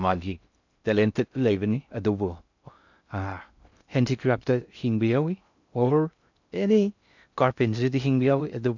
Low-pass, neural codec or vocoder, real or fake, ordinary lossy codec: 7.2 kHz; codec, 16 kHz in and 24 kHz out, 0.6 kbps, FocalCodec, streaming, 2048 codes; fake; none